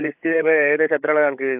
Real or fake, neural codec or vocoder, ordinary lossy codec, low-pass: fake; codec, 16 kHz, 8 kbps, FreqCodec, larger model; AAC, 32 kbps; 3.6 kHz